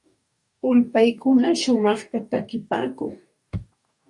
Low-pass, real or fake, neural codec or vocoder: 10.8 kHz; fake; codec, 44.1 kHz, 2.6 kbps, DAC